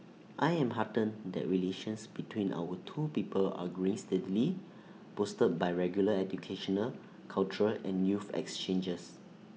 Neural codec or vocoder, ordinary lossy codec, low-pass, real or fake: none; none; none; real